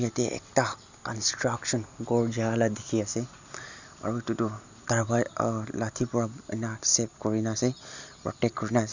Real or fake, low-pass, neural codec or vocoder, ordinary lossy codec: real; 7.2 kHz; none; Opus, 64 kbps